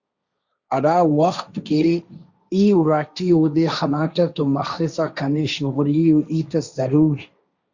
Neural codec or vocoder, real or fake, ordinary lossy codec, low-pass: codec, 16 kHz, 1.1 kbps, Voila-Tokenizer; fake; Opus, 64 kbps; 7.2 kHz